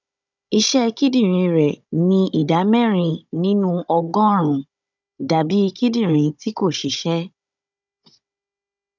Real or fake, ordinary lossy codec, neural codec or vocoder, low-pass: fake; none; codec, 16 kHz, 16 kbps, FunCodec, trained on Chinese and English, 50 frames a second; 7.2 kHz